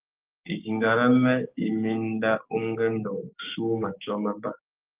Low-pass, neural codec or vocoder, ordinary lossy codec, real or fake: 3.6 kHz; codec, 16 kHz, 6 kbps, DAC; Opus, 32 kbps; fake